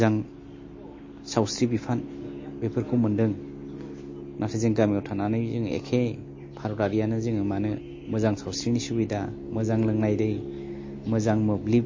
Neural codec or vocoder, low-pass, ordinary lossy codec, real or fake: none; 7.2 kHz; MP3, 32 kbps; real